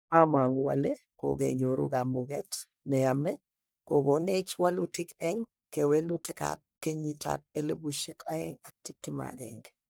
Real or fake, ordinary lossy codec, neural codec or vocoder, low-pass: fake; none; codec, 44.1 kHz, 1.7 kbps, Pupu-Codec; none